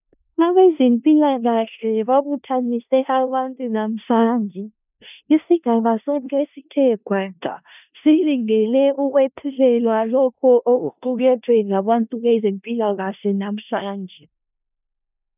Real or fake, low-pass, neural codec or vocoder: fake; 3.6 kHz; codec, 16 kHz in and 24 kHz out, 0.4 kbps, LongCat-Audio-Codec, four codebook decoder